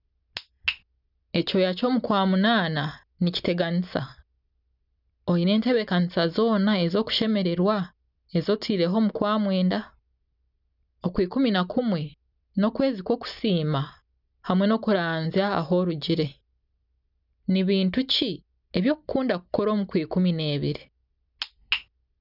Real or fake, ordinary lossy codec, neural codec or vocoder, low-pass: real; none; none; 5.4 kHz